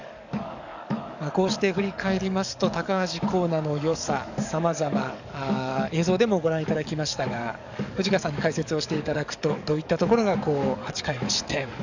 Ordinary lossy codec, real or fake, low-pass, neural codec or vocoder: none; fake; 7.2 kHz; codec, 44.1 kHz, 7.8 kbps, DAC